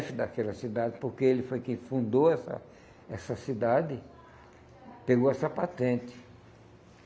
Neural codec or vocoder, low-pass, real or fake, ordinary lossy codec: none; none; real; none